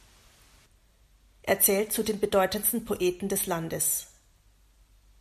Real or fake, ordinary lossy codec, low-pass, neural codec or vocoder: real; MP3, 96 kbps; 14.4 kHz; none